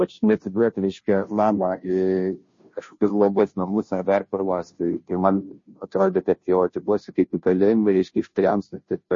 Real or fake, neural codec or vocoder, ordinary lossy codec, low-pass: fake; codec, 16 kHz, 0.5 kbps, FunCodec, trained on Chinese and English, 25 frames a second; MP3, 32 kbps; 7.2 kHz